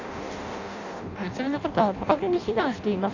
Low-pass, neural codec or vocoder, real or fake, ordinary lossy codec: 7.2 kHz; codec, 16 kHz in and 24 kHz out, 0.6 kbps, FireRedTTS-2 codec; fake; Opus, 64 kbps